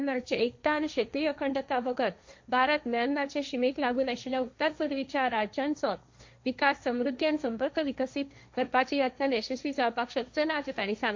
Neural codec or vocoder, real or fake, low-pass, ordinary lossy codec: codec, 16 kHz, 1.1 kbps, Voila-Tokenizer; fake; 7.2 kHz; MP3, 48 kbps